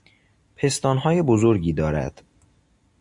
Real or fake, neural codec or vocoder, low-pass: real; none; 10.8 kHz